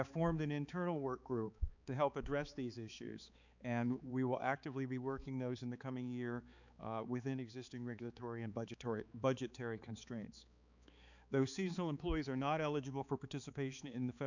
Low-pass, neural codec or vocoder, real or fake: 7.2 kHz; codec, 16 kHz, 4 kbps, X-Codec, HuBERT features, trained on balanced general audio; fake